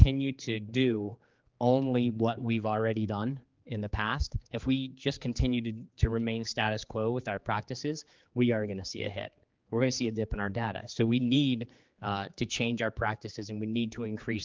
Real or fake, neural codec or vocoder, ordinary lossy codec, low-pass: fake; codec, 16 kHz, 4 kbps, X-Codec, HuBERT features, trained on general audio; Opus, 32 kbps; 7.2 kHz